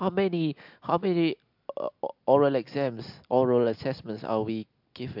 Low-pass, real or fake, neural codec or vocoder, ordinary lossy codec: 5.4 kHz; real; none; MP3, 48 kbps